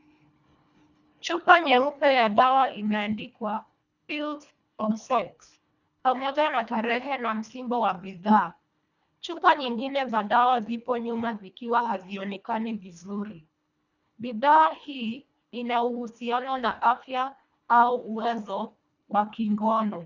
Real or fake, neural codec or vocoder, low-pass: fake; codec, 24 kHz, 1.5 kbps, HILCodec; 7.2 kHz